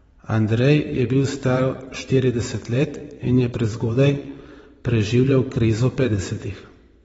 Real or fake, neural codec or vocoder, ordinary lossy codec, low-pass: fake; vocoder, 22.05 kHz, 80 mel bands, WaveNeXt; AAC, 24 kbps; 9.9 kHz